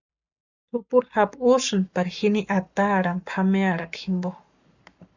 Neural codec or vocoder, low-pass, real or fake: codec, 44.1 kHz, 7.8 kbps, Pupu-Codec; 7.2 kHz; fake